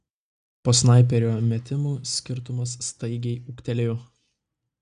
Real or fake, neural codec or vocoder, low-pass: real; none; 9.9 kHz